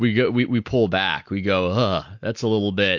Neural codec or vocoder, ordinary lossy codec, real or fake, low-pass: none; MP3, 48 kbps; real; 7.2 kHz